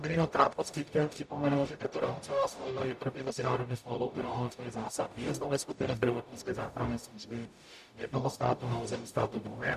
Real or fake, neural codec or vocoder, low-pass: fake; codec, 44.1 kHz, 0.9 kbps, DAC; 14.4 kHz